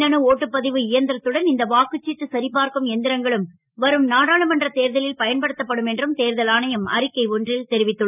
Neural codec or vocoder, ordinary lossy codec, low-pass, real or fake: none; none; 3.6 kHz; real